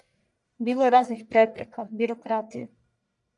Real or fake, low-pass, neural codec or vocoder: fake; 10.8 kHz; codec, 44.1 kHz, 1.7 kbps, Pupu-Codec